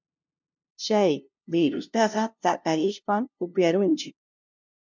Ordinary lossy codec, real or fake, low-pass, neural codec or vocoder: MP3, 64 kbps; fake; 7.2 kHz; codec, 16 kHz, 0.5 kbps, FunCodec, trained on LibriTTS, 25 frames a second